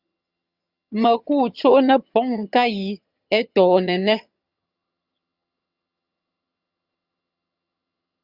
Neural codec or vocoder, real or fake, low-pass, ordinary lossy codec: vocoder, 22.05 kHz, 80 mel bands, HiFi-GAN; fake; 5.4 kHz; Opus, 64 kbps